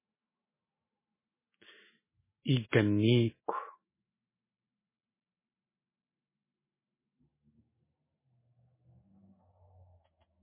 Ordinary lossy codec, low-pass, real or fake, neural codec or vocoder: MP3, 16 kbps; 3.6 kHz; fake; autoencoder, 48 kHz, 128 numbers a frame, DAC-VAE, trained on Japanese speech